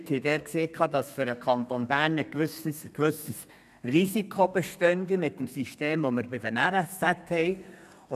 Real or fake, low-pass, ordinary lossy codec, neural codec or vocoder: fake; 14.4 kHz; none; codec, 32 kHz, 1.9 kbps, SNAC